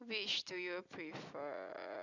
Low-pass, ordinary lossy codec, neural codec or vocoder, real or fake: 7.2 kHz; Opus, 64 kbps; none; real